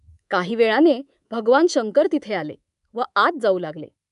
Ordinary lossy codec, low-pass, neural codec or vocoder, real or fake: none; 10.8 kHz; codec, 24 kHz, 3.1 kbps, DualCodec; fake